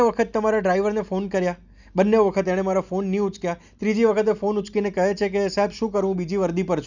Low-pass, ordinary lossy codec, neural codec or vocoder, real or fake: 7.2 kHz; none; none; real